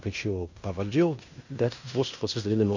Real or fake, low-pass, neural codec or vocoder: fake; 7.2 kHz; codec, 16 kHz in and 24 kHz out, 0.9 kbps, LongCat-Audio-Codec, fine tuned four codebook decoder